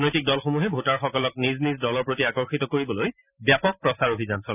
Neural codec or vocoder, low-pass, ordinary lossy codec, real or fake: none; 3.6 kHz; none; real